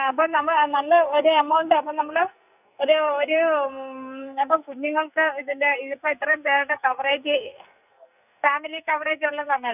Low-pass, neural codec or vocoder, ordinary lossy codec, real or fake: 3.6 kHz; codec, 44.1 kHz, 2.6 kbps, SNAC; none; fake